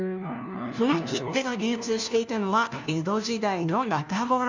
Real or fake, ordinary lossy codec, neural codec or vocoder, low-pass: fake; none; codec, 16 kHz, 1 kbps, FunCodec, trained on LibriTTS, 50 frames a second; 7.2 kHz